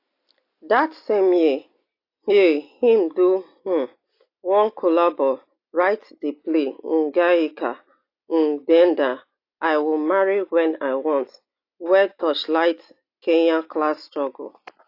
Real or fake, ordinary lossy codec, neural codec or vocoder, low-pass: real; AAC, 32 kbps; none; 5.4 kHz